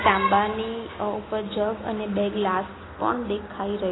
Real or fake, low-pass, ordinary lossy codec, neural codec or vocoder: real; 7.2 kHz; AAC, 16 kbps; none